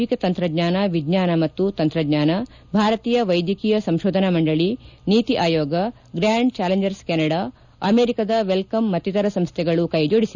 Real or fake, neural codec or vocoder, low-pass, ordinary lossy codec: real; none; 7.2 kHz; none